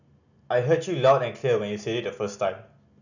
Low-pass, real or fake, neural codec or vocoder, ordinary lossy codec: 7.2 kHz; real; none; none